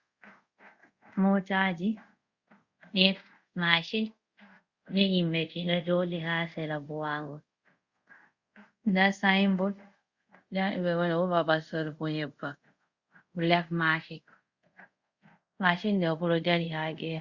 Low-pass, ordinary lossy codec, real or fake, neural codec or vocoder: 7.2 kHz; Opus, 64 kbps; fake; codec, 24 kHz, 0.5 kbps, DualCodec